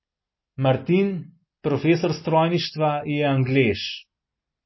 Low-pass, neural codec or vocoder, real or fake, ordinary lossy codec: 7.2 kHz; none; real; MP3, 24 kbps